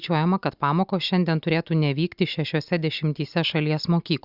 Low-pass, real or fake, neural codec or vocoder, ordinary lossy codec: 5.4 kHz; real; none; Opus, 64 kbps